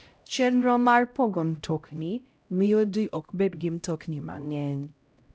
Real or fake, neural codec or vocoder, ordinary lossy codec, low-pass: fake; codec, 16 kHz, 0.5 kbps, X-Codec, HuBERT features, trained on LibriSpeech; none; none